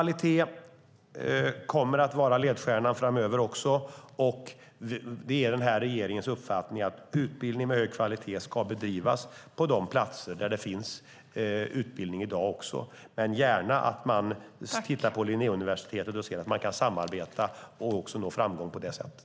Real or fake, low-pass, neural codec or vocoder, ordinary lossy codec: real; none; none; none